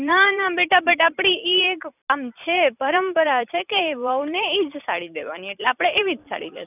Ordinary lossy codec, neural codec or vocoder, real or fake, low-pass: none; vocoder, 44.1 kHz, 128 mel bands every 256 samples, BigVGAN v2; fake; 3.6 kHz